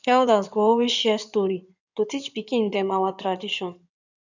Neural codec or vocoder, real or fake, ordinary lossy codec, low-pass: codec, 16 kHz in and 24 kHz out, 2.2 kbps, FireRedTTS-2 codec; fake; none; 7.2 kHz